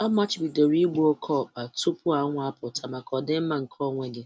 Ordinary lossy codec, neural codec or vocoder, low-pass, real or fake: none; none; none; real